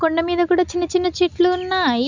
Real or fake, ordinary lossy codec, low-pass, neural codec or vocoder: real; none; 7.2 kHz; none